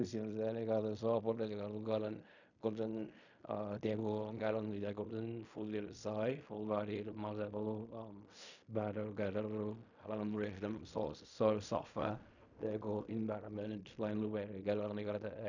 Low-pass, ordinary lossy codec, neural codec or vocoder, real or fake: 7.2 kHz; none; codec, 16 kHz in and 24 kHz out, 0.4 kbps, LongCat-Audio-Codec, fine tuned four codebook decoder; fake